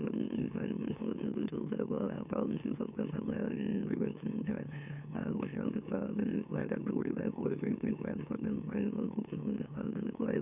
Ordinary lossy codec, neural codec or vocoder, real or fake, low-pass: none; autoencoder, 44.1 kHz, a latent of 192 numbers a frame, MeloTTS; fake; 3.6 kHz